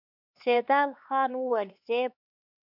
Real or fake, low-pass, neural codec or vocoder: fake; 5.4 kHz; codec, 16 kHz, 4 kbps, X-Codec, HuBERT features, trained on LibriSpeech